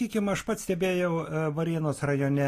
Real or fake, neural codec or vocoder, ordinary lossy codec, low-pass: real; none; AAC, 48 kbps; 14.4 kHz